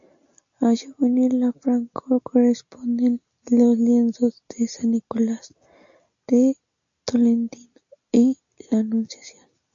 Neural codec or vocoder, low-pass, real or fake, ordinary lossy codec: none; 7.2 kHz; real; AAC, 64 kbps